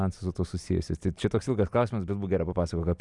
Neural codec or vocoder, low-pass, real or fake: none; 10.8 kHz; real